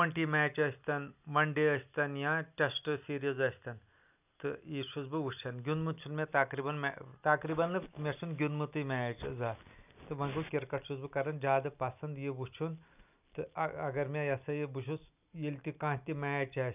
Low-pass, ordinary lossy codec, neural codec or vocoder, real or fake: 3.6 kHz; none; none; real